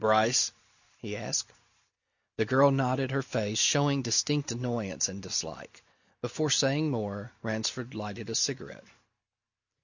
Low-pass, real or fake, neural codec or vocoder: 7.2 kHz; real; none